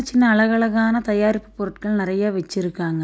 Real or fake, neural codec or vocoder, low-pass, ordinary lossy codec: real; none; none; none